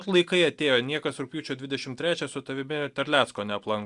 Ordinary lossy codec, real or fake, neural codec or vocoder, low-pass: Opus, 64 kbps; real; none; 10.8 kHz